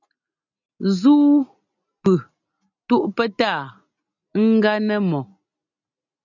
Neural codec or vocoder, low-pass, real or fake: none; 7.2 kHz; real